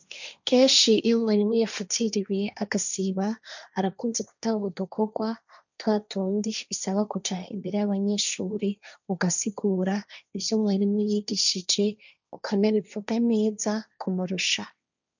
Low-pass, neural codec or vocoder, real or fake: 7.2 kHz; codec, 16 kHz, 1.1 kbps, Voila-Tokenizer; fake